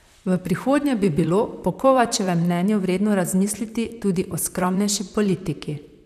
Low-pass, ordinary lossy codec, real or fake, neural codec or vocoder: 14.4 kHz; none; fake; vocoder, 44.1 kHz, 128 mel bands, Pupu-Vocoder